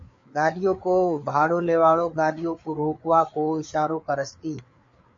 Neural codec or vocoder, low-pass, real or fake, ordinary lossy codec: codec, 16 kHz, 4 kbps, FreqCodec, larger model; 7.2 kHz; fake; MP3, 48 kbps